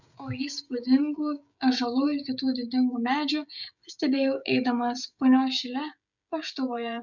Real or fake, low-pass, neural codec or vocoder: fake; 7.2 kHz; codec, 16 kHz, 16 kbps, FreqCodec, smaller model